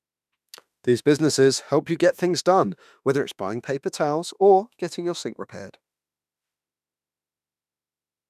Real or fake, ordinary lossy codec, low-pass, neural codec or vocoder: fake; none; 14.4 kHz; autoencoder, 48 kHz, 32 numbers a frame, DAC-VAE, trained on Japanese speech